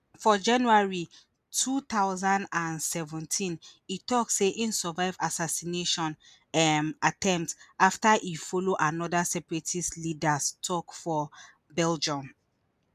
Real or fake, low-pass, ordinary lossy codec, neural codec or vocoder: real; 14.4 kHz; none; none